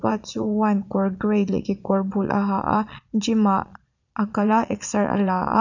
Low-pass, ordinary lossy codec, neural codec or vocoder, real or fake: 7.2 kHz; none; vocoder, 22.05 kHz, 80 mel bands, Vocos; fake